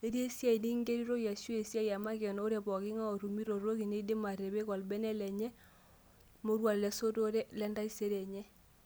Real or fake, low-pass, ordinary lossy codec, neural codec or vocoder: real; none; none; none